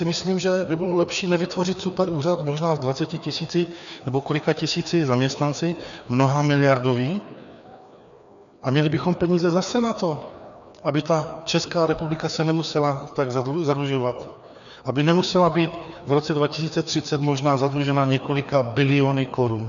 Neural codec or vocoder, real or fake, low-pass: codec, 16 kHz, 2 kbps, FreqCodec, larger model; fake; 7.2 kHz